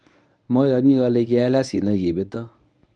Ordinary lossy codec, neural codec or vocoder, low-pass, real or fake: none; codec, 24 kHz, 0.9 kbps, WavTokenizer, medium speech release version 1; 9.9 kHz; fake